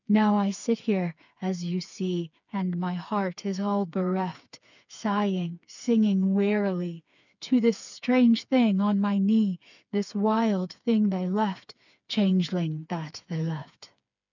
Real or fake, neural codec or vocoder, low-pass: fake; codec, 16 kHz, 4 kbps, FreqCodec, smaller model; 7.2 kHz